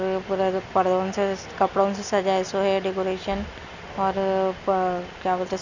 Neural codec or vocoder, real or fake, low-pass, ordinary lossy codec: none; real; 7.2 kHz; none